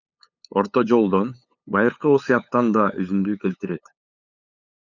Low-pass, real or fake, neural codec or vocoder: 7.2 kHz; fake; codec, 16 kHz, 8 kbps, FunCodec, trained on LibriTTS, 25 frames a second